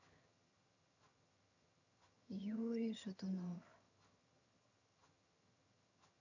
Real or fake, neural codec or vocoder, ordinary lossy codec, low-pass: fake; vocoder, 22.05 kHz, 80 mel bands, HiFi-GAN; none; 7.2 kHz